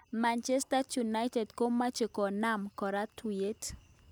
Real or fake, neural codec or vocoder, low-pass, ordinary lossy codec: real; none; none; none